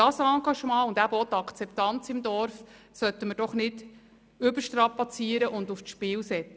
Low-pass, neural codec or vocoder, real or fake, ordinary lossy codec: none; none; real; none